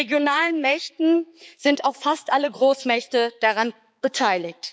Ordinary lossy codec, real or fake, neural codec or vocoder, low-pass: none; fake; codec, 16 kHz, 4 kbps, X-Codec, HuBERT features, trained on balanced general audio; none